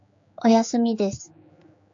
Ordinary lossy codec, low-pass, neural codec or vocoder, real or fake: AAC, 64 kbps; 7.2 kHz; codec, 16 kHz, 4 kbps, X-Codec, HuBERT features, trained on general audio; fake